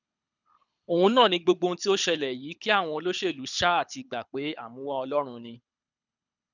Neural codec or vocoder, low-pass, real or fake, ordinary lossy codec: codec, 24 kHz, 6 kbps, HILCodec; 7.2 kHz; fake; none